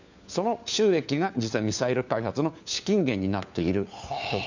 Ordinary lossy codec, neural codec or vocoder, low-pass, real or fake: none; codec, 16 kHz, 4 kbps, FunCodec, trained on LibriTTS, 50 frames a second; 7.2 kHz; fake